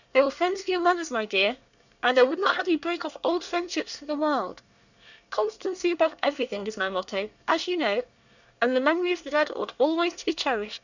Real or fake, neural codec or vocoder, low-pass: fake; codec, 24 kHz, 1 kbps, SNAC; 7.2 kHz